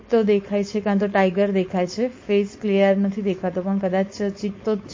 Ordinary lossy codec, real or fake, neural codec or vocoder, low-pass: MP3, 32 kbps; fake; codec, 16 kHz, 4.8 kbps, FACodec; 7.2 kHz